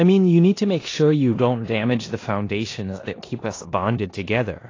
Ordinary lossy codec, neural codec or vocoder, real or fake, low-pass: AAC, 32 kbps; codec, 16 kHz in and 24 kHz out, 0.9 kbps, LongCat-Audio-Codec, four codebook decoder; fake; 7.2 kHz